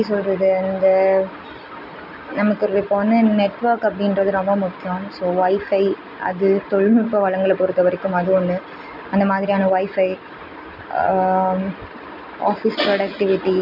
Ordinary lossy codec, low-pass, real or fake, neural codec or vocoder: none; 5.4 kHz; real; none